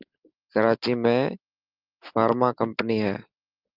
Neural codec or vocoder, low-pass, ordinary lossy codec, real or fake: none; 5.4 kHz; Opus, 24 kbps; real